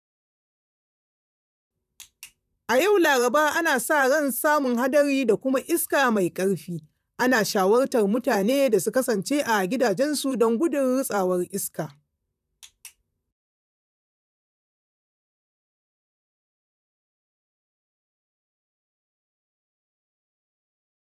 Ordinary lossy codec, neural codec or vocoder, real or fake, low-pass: none; vocoder, 44.1 kHz, 128 mel bands, Pupu-Vocoder; fake; 14.4 kHz